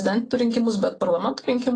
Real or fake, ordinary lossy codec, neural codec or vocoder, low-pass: real; AAC, 32 kbps; none; 9.9 kHz